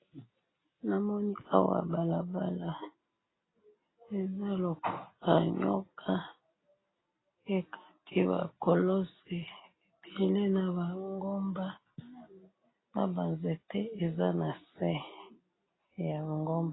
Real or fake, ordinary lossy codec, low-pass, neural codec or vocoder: real; AAC, 16 kbps; 7.2 kHz; none